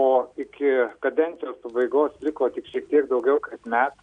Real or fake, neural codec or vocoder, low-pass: real; none; 9.9 kHz